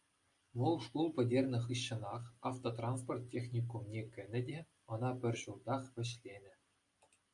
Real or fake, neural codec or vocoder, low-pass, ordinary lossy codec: real; none; 10.8 kHz; MP3, 96 kbps